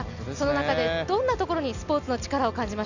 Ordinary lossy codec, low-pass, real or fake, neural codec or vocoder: none; 7.2 kHz; real; none